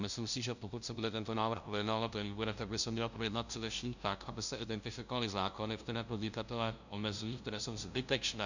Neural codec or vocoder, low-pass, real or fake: codec, 16 kHz, 0.5 kbps, FunCodec, trained on LibriTTS, 25 frames a second; 7.2 kHz; fake